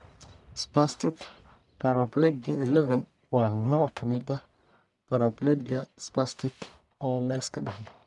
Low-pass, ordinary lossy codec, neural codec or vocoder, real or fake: 10.8 kHz; none; codec, 44.1 kHz, 1.7 kbps, Pupu-Codec; fake